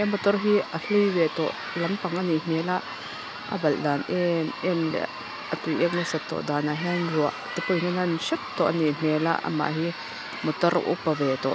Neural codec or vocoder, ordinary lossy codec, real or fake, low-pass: none; none; real; none